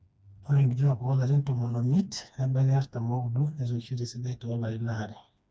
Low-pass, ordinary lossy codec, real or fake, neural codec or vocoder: none; none; fake; codec, 16 kHz, 2 kbps, FreqCodec, smaller model